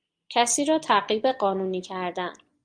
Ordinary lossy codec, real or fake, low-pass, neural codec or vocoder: Opus, 32 kbps; real; 9.9 kHz; none